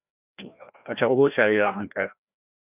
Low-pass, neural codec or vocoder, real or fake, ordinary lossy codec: 3.6 kHz; codec, 16 kHz, 1 kbps, FreqCodec, larger model; fake; none